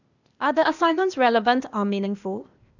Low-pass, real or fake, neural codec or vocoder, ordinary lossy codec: 7.2 kHz; fake; codec, 16 kHz, 0.8 kbps, ZipCodec; none